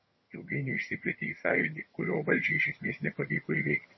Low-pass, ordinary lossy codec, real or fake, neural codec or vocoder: 7.2 kHz; MP3, 24 kbps; fake; vocoder, 22.05 kHz, 80 mel bands, HiFi-GAN